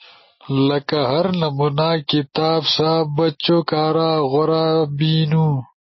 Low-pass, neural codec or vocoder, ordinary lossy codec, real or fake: 7.2 kHz; none; MP3, 24 kbps; real